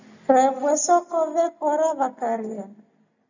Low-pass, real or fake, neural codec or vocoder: 7.2 kHz; real; none